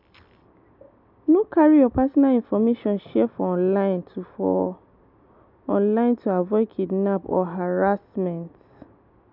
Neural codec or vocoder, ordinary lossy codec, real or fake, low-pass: none; MP3, 48 kbps; real; 5.4 kHz